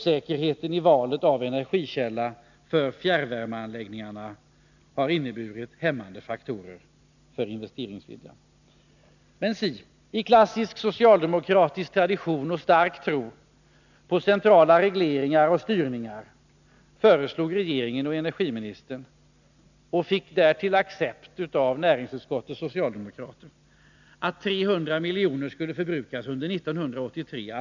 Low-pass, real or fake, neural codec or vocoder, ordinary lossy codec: 7.2 kHz; real; none; none